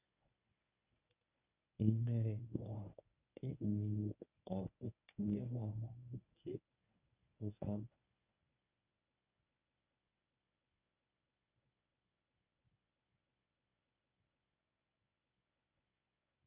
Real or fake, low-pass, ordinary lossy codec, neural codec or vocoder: fake; 3.6 kHz; none; codec, 24 kHz, 0.9 kbps, WavTokenizer, medium speech release version 1